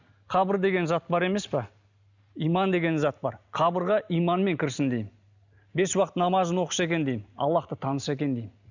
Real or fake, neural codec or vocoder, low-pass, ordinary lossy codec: real; none; 7.2 kHz; none